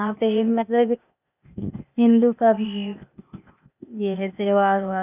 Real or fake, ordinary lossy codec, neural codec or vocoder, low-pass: fake; none; codec, 16 kHz, 0.8 kbps, ZipCodec; 3.6 kHz